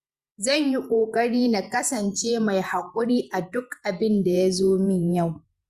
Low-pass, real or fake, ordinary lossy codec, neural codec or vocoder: 14.4 kHz; fake; Opus, 64 kbps; vocoder, 48 kHz, 128 mel bands, Vocos